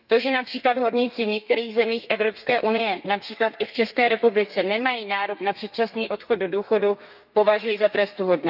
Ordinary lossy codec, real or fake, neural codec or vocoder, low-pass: none; fake; codec, 32 kHz, 1.9 kbps, SNAC; 5.4 kHz